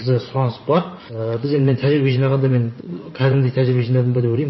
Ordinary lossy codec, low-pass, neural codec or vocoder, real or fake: MP3, 24 kbps; 7.2 kHz; vocoder, 44.1 kHz, 80 mel bands, Vocos; fake